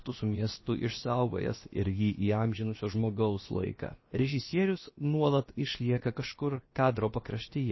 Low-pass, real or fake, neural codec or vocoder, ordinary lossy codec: 7.2 kHz; fake; codec, 16 kHz, about 1 kbps, DyCAST, with the encoder's durations; MP3, 24 kbps